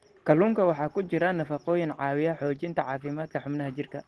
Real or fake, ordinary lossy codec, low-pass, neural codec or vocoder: real; Opus, 16 kbps; 10.8 kHz; none